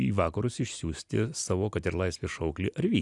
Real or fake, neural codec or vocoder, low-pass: real; none; 10.8 kHz